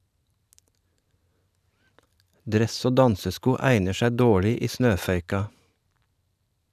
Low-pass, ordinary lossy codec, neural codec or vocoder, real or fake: 14.4 kHz; none; vocoder, 44.1 kHz, 128 mel bands every 512 samples, BigVGAN v2; fake